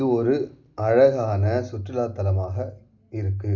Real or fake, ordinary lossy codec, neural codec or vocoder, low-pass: real; Opus, 64 kbps; none; 7.2 kHz